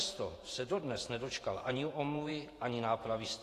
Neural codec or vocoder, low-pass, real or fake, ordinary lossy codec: vocoder, 48 kHz, 128 mel bands, Vocos; 14.4 kHz; fake; AAC, 48 kbps